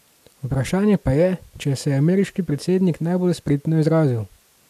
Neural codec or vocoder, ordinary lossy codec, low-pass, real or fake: none; AAC, 96 kbps; 14.4 kHz; real